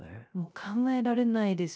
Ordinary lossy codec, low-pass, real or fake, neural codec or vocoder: none; none; fake; codec, 16 kHz, 0.3 kbps, FocalCodec